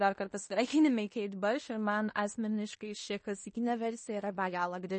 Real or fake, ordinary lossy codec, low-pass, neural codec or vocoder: fake; MP3, 32 kbps; 10.8 kHz; codec, 16 kHz in and 24 kHz out, 0.9 kbps, LongCat-Audio-Codec, fine tuned four codebook decoder